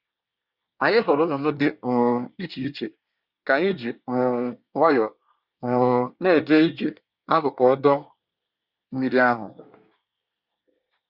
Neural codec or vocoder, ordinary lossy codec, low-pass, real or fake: codec, 24 kHz, 1 kbps, SNAC; Opus, 64 kbps; 5.4 kHz; fake